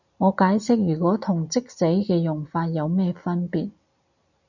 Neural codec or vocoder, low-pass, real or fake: none; 7.2 kHz; real